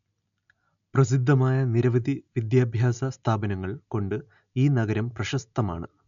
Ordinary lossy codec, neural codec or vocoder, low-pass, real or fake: none; none; 7.2 kHz; real